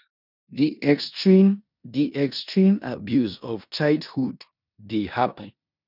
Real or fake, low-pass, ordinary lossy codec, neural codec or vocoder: fake; 5.4 kHz; none; codec, 16 kHz in and 24 kHz out, 0.9 kbps, LongCat-Audio-Codec, four codebook decoder